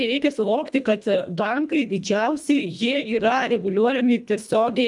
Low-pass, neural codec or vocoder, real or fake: 10.8 kHz; codec, 24 kHz, 1.5 kbps, HILCodec; fake